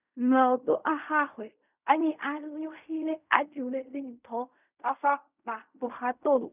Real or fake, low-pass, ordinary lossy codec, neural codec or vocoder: fake; 3.6 kHz; none; codec, 16 kHz in and 24 kHz out, 0.4 kbps, LongCat-Audio-Codec, fine tuned four codebook decoder